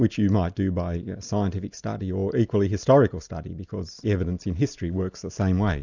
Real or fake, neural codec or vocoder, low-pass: real; none; 7.2 kHz